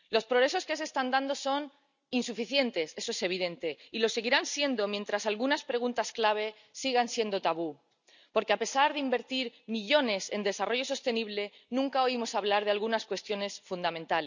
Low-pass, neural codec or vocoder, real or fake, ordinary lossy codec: 7.2 kHz; none; real; none